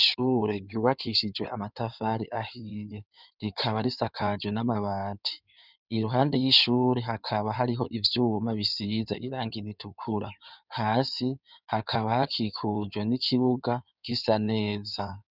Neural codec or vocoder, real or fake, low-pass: codec, 16 kHz in and 24 kHz out, 2.2 kbps, FireRedTTS-2 codec; fake; 5.4 kHz